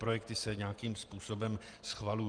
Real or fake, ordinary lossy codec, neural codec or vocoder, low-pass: fake; Opus, 32 kbps; vocoder, 48 kHz, 128 mel bands, Vocos; 9.9 kHz